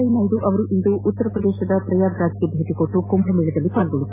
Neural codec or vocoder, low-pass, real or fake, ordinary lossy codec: none; 3.6 kHz; real; AAC, 24 kbps